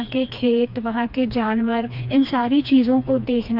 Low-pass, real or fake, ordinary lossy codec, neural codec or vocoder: 5.4 kHz; fake; MP3, 48 kbps; codec, 16 kHz, 2 kbps, FreqCodec, smaller model